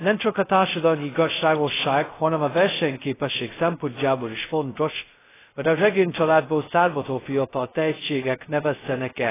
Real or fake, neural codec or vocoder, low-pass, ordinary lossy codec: fake; codec, 16 kHz, 0.2 kbps, FocalCodec; 3.6 kHz; AAC, 16 kbps